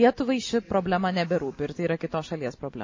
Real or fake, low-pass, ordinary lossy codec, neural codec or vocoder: real; 7.2 kHz; MP3, 32 kbps; none